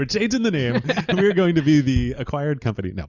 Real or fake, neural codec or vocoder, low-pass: real; none; 7.2 kHz